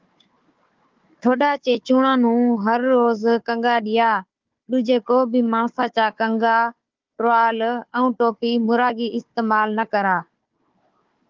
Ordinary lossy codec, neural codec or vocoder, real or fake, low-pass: Opus, 32 kbps; codec, 16 kHz, 4 kbps, FunCodec, trained on Chinese and English, 50 frames a second; fake; 7.2 kHz